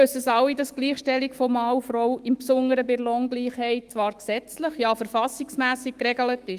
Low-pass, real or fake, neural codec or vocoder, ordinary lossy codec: 14.4 kHz; fake; autoencoder, 48 kHz, 128 numbers a frame, DAC-VAE, trained on Japanese speech; Opus, 24 kbps